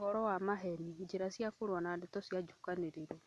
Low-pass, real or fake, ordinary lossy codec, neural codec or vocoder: none; real; none; none